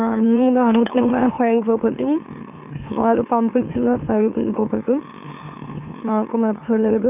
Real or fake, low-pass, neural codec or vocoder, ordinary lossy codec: fake; 3.6 kHz; autoencoder, 44.1 kHz, a latent of 192 numbers a frame, MeloTTS; none